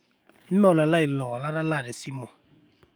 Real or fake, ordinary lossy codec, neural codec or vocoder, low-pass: fake; none; codec, 44.1 kHz, 7.8 kbps, DAC; none